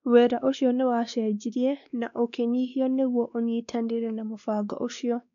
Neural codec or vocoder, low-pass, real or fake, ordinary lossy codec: codec, 16 kHz, 2 kbps, X-Codec, WavLM features, trained on Multilingual LibriSpeech; 7.2 kHz; fake; none